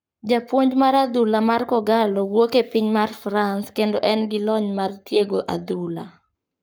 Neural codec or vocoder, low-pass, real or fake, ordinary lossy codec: codec, 44.1 kHz, 7.8 kbps, Pupu-Codec; none; fake; none